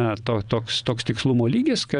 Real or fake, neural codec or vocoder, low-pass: fake; vocoder, 22.05 kHz, 80 mel bands, WaveNeXt; 9.9 kHz